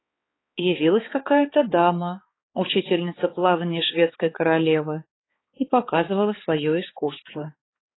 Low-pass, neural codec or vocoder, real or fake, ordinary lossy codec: 7.2 kHz; codec, 16 kHz, 4 kbps, X-Codec, HuBERT features, trained on balanced general audio; fake; AAC, 16 kbps